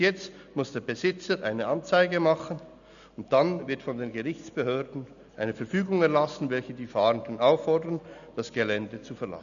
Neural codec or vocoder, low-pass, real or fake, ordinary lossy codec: none; 7.2 kHz; real; none